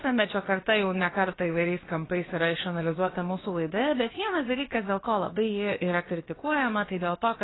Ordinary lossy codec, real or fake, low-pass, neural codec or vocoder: AAC, 16 kbps; fake; 7.2 kHz; codec, 16 kHz, about 1 kbps, DyCAST, with the encoder's durations